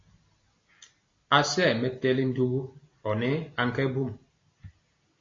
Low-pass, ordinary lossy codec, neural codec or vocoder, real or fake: 7.2 kHz; AAC, 32 kbps; none; real